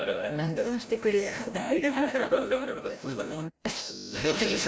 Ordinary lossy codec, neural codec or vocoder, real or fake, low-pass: none; codec, 16 kHz, 0.5 kbps, FreqCodec, larger model; fake; none